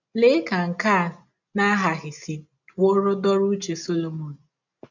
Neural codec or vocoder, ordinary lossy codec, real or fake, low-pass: none; none; real; 7.2 kHz